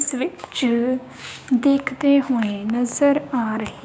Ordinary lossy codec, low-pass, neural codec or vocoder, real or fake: none; none; codec, 16 kHz, 6 kbps, DAC; fake